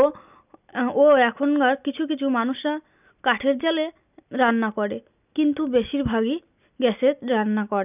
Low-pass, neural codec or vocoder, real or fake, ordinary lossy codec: 3.6 kHz; none; real; none